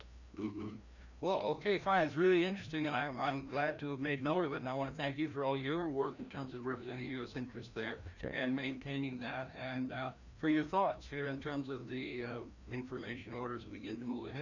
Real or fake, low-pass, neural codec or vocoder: fake; 7.2 kHz; codec, 16 kHz, 1 kbps, FreqCodec, larger model